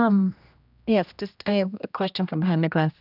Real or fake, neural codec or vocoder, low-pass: fake; codec, 16 kHz, 1 kbps, X-Codec, HuBERT features, trained on general audio; 5.4 kHz